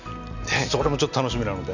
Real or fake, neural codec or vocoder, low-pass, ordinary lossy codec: real; none; 7.2 kHz; none